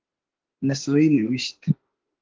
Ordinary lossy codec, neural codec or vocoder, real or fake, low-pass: Opus, 16 kbps; autoencoder, 48 kHz, 32 numbers a frame, DAC-VAE, trained on Japanese speech; fake; 7.2 kHz